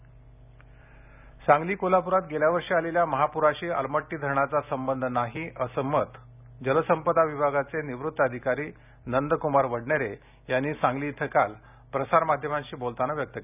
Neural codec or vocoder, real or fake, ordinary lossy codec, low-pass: none; real; none; 3.6 kHz